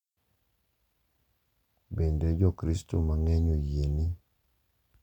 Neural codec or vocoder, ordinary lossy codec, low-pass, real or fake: none; none; 19.8 kHz; real